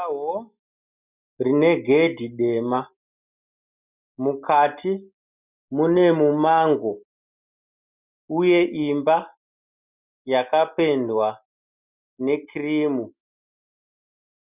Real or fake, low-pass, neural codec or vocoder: real; 3.6 kHz; none